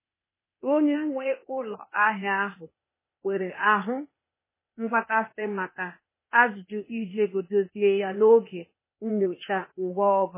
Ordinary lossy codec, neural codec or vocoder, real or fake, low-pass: MP3, 16 kbps; codec, 16 kHz, 0.8 kbps, ZipCodec; fake; 3.6 kHz